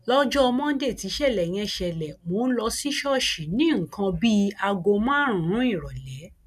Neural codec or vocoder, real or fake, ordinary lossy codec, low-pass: none; real; none; 14.4 kHz